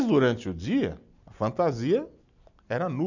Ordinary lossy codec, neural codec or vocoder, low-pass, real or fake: none; none; 7.2 kHz; real